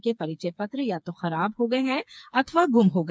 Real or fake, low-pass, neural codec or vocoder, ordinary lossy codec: fake; none; codec, 16 kHz, 4 kbps, FreqCodec, smaller model; none